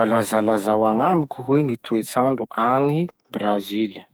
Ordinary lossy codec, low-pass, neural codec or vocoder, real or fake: none; none; codec, 44.1 kHz, 2.6 kbps, SNAC; fake